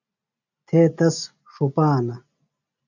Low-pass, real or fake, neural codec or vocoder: 7.2 kHz; real; none